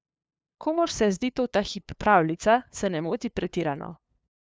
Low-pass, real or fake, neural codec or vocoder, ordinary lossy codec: none; fake; codec, 16 kHz, 2 kbps, FunCodec, trained on LibriTTS, 25 frames a second; none